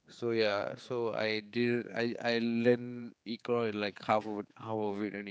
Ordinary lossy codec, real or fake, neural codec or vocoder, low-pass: none; fake; codec, 16 kHz, 4 kbps, X-Codec, HuBERT features, trained on general audio; none